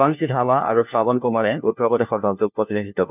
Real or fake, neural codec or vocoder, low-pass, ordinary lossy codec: fake; codec, 16 kHz, 1 kbps, FunCodec, trained on LibriTTS, 50 frames a second; 3.6 kHz; none